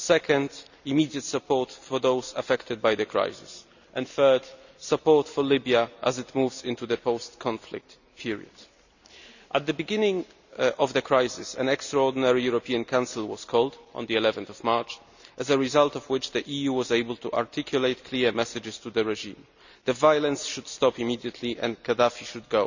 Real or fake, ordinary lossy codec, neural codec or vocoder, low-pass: real; none; none; 7.2 kHz